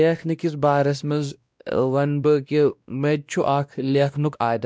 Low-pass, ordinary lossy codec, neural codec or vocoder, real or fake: none; none; codec, 16 kHz, 1 kbps, X-Codec, WavLM features, trained on Multilingual LibriSpeech; fake